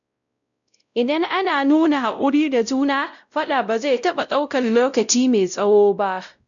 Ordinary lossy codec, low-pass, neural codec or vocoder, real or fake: none; 7.2 kHz; codec, 16 kHz, 0.5 kbps, X-Codec, WavLM features, trained on Multilingual LibriSpeech; fake